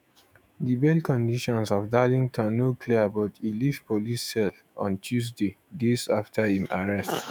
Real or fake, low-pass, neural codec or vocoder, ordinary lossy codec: fake; none; autoencoder, 48 kHz, 128 numbers a frame, DAC-VAE, trained on Japanese speech; none